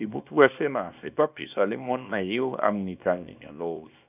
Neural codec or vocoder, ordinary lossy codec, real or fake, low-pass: codec, 24 kHz, 0.9 kbps, WavTokenizer, small release; none; fake; 3.6 kHz